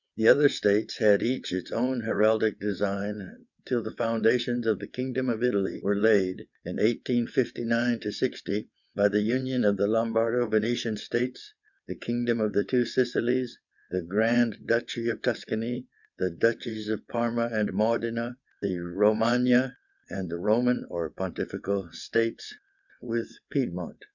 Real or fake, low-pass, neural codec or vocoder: fake; 7.2 kHz; vocoder, 22.05 kHz, 80 mel bands, WaveNeXt